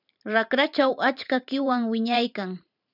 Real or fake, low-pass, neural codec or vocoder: fake; 5.4 kHz; vocoder, 44.1 kHz, 128 mel bands every 512 samples, BigVGAN v2